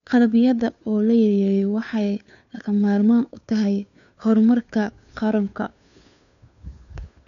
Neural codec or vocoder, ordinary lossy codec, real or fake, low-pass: codec, 16 kHz, 2 kbps, FunCodec, trained on Chinese and English, 25 frames a second; none; fake; 7.2 kHz